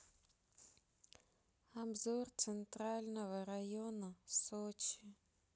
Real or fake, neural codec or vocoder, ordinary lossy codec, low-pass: real; none; none; none